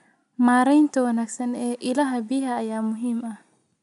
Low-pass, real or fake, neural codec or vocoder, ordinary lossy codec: 10.8 kHz; real; none; none